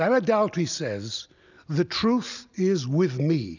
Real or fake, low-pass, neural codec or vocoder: real; 7.2 kHz; none